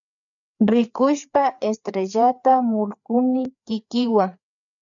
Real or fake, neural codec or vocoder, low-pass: fake; codec, 16 kHz, 4 kbps, FreqCodec, larger model; 7.2 kHz